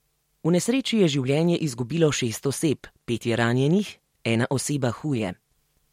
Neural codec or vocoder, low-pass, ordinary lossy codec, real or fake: none; 19.8 kHz; MP3, 64 kbps; real